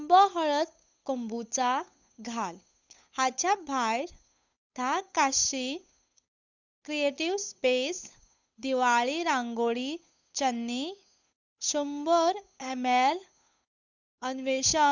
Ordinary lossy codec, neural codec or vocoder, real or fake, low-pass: none; codec, 16 kHz, 8 kbps, FunCodec, trained on Chinese and English, 25 frames a second; fake; 7.2 kHz